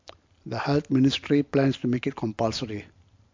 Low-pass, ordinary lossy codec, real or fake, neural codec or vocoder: 7.2 kHz; MP3, 48 kbps; real; none